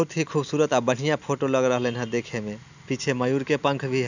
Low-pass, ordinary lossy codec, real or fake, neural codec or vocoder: 7.2 kHz; none; real; none